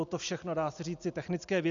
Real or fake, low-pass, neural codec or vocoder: real; 7.2 kHz; none